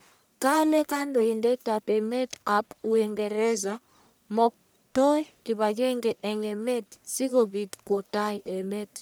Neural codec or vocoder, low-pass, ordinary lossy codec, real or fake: codec, 44.1 kHz, 1.7 kbps, Pupu-Codec; none; none; fake